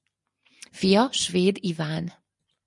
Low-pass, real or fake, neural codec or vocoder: 10.8 kHz; real; none